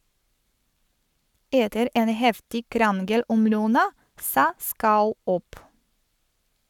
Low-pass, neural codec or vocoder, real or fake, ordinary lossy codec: 19.8 kHz; codec, 44.1 kHz, 7.8 kbps, Pupu-Codec; fake; none